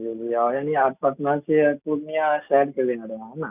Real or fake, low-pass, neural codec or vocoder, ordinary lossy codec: real; 3.6 kHz; none; none